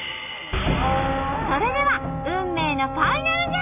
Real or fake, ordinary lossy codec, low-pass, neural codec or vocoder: real; none; 3.6 kHz; none